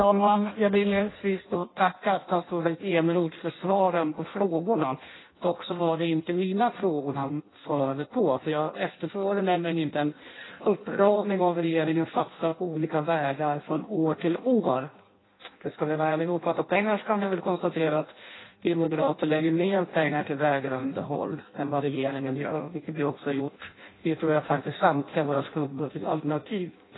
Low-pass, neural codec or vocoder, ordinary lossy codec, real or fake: 7.2 kHz; codec, 16 kHz in and 24 kHz out, 0.6 kbps, FireRedTTS-2 codec; AAC, 16 kbps; fake